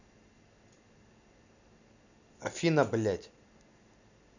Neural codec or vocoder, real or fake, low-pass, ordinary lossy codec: none; real; 7.2 kHz; none